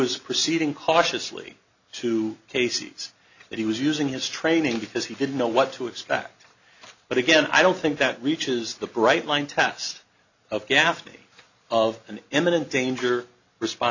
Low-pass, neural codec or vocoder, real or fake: 7.2 kHz; none; real